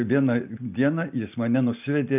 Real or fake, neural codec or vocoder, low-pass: real; none; 3.6 kHz